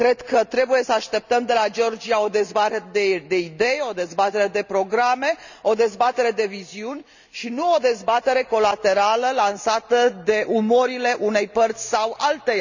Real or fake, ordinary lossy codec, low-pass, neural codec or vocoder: real; none; 7.2 kHz; none